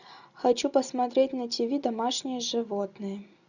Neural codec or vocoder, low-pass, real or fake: none; 7.2 kHz; real